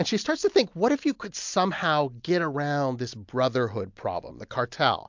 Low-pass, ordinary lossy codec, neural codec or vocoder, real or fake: 7.2 kHz; MP3, 64 kbps; none; real